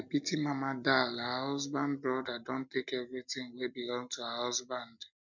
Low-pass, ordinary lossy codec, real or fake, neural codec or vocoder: 7.2 kHz; none; real; none